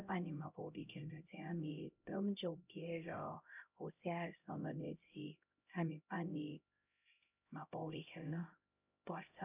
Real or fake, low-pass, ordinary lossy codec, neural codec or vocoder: fake; 3.6 kHz; none; codec, 16 kHz, 0.5 kbps, X-Codec, HuBERT features, trained on LibriSpeech